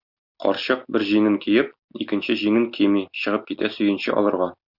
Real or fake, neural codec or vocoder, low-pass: real; none; 5.4 kHz